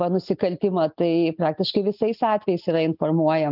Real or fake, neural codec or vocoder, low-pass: real; none; 5.4 kHz